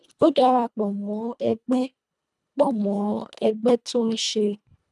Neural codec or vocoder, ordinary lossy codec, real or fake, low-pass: codec, 24 kHz, 1.5 kbps, HILCodec; none; fake; none